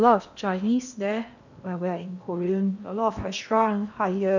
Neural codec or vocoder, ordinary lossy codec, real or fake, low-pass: codec, 16 kHz in and 24 kHz out, 0.8 kbps, FocalCodec, streaming, 65536 codes; none; fake; 7.2 kHz